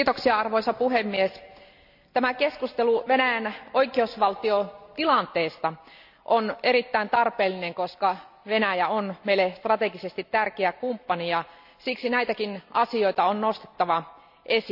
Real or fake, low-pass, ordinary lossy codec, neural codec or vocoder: real; 5.4 kHz; none; none